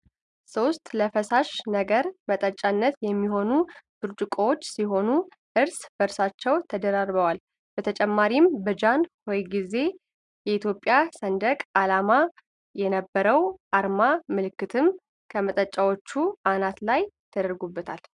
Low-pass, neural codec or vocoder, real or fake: 10.8 kHz; none; real